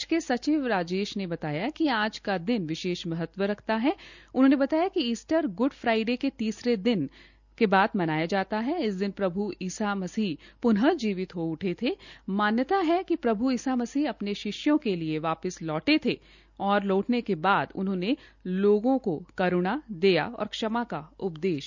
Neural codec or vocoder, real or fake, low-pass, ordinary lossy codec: none; real; 7.2 kHz; none